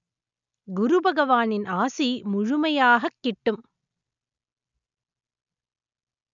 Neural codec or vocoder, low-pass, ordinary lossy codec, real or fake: none; 7.2 kHz; none; real